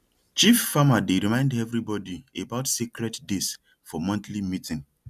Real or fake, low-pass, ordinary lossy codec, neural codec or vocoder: real; 14.4 kHz; none; none